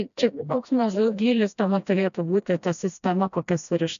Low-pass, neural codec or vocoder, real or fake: 7.2 kHz; codec, 16 kHz, 1 kbps, FreqCodec, smaller model; fake